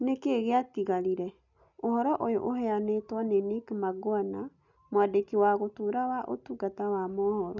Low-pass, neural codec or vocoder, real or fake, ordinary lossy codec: 7.2 kHz; none; real; none